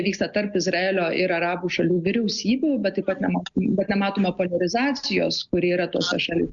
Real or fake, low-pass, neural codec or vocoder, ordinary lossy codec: real; 7.2 kHz; none; Opus, 64 kbps